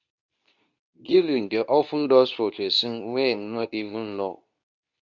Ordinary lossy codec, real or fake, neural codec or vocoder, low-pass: none; fake; codec, 24 kHz, 0.9 kbps, WavTokenizer, medium speech release version 2; 7.2 kHz